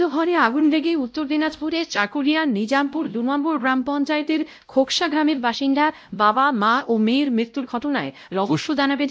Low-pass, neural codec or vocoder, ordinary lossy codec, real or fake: none; codec, 16 kHz, 0.5 kbps, X-Codec, WavLM features, trained on Multilingual LibriSpeech; none; fake